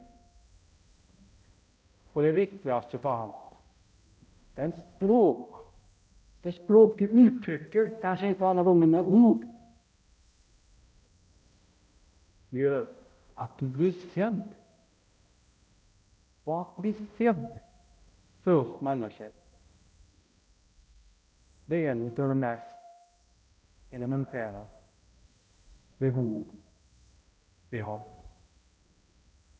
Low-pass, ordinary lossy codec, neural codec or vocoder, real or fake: none; none; codec, 16 kHz, 0.5 kbps, X-Codec, HuBERT features, trained on balanced general audio; fake